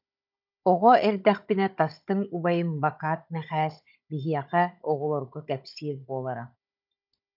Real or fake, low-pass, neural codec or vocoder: fake; 5.4 kHz; codec, 16 kHz, 16 kbps, FunCodec, trained on Chinese and English, 50 frames a second